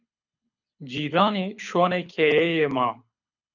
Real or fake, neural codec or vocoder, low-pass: fake; codec, 24 kHz, 6 kbps, HILCodec; 7.2 kHz